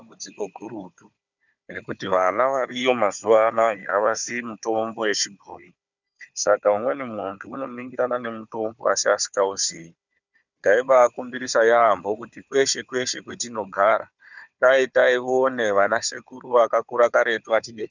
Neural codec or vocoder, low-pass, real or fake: codec, 16 kHz, 4 kbps, FunCodec, trained on Chinese and English, 50 frames a second; 7.2 kHz; fake